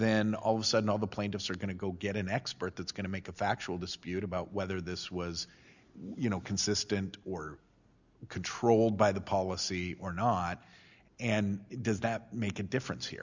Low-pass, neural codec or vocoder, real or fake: 7.2 kHz; none; real